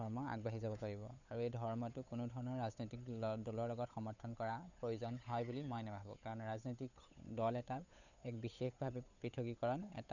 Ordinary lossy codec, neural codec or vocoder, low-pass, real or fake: none; codec, 16 kHz, 8 kbps, FunCodec, trained on Chinese and English, 25 frames a second; 7.2 kHz; fake